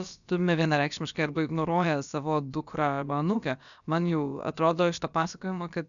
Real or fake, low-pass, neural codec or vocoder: fake; 7.2 kHz; codec, 16 kHz, about 1 kbps, DyCAST, with the encoder's durations